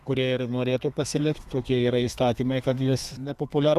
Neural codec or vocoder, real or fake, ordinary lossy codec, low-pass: codec, 32 kHz, 1.9 kbps, SNAC; fake; Opus, 64 kbps; 14.4 kHz